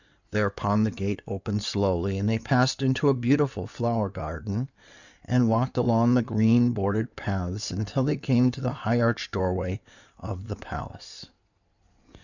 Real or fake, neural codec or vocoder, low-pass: fake; codec, 16 kHz in and 24 kHz out, 2.2 kbps, FireRedTTS-2 codec; 7.2 kHz